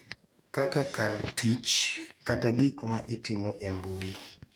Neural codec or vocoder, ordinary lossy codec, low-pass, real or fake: codec, 44.1 kHz, 2.6 kbps, DAC; none; none; fake